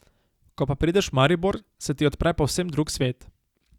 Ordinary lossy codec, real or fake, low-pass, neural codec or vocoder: Opus, 64 kbps; real; 19.8 kHz; none